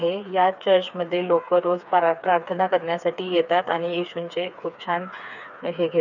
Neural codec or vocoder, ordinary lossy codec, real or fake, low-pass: codec, 16 kHz, 4 kbps, FreqCodec, smaller model; none; fake; 7.2 kHz